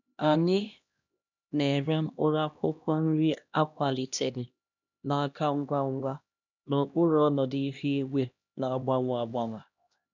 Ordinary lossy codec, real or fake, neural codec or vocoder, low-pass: none; fake; codec, 16 kHz, 1 kbps, X-Codec, HuBERT features, trained on LibriSpeech; 7.2 kHz